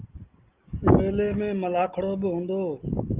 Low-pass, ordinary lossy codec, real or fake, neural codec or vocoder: 3.6 kHz; Opus, 32 kbps; real; none